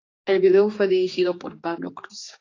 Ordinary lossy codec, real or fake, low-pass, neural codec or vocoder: AAC, 32 kbps; fake; 7.2 kHz; codec, 16 kHz, 2 kbps, X-Codec, HuBERT features, trained on general audio